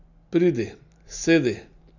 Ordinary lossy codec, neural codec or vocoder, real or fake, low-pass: none; none; real; 7.2 kHz